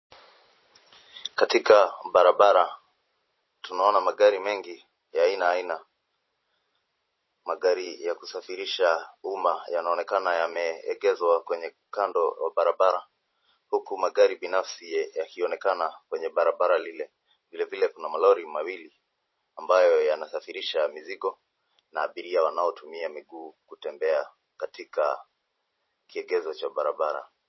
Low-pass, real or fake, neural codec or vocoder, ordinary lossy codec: 7.2 kHz; fake; vocoder, 44.1 kHz, 128 mel bands every 512 samples, BigVGAN v2; MP3, 24 kbps